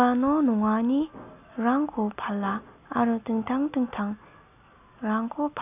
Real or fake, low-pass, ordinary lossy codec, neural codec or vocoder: real; 3.6 kHz; none; none